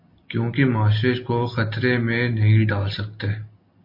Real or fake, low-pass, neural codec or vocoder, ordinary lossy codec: real; 5.4 kHz; none; MP3, 32 kbps